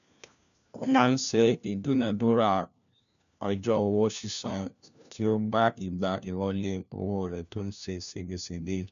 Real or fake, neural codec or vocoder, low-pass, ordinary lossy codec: fake; codec, 16 kHz, 1 kbps, FunCodec, trained on LibriTTS, 50 frames a second; 7.2 kHz; none